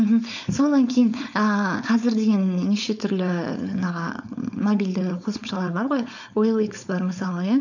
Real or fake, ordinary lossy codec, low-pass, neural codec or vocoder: fake; none; 7.2 kHz; codec, 16 kHz, 4.8 kbps, FACodec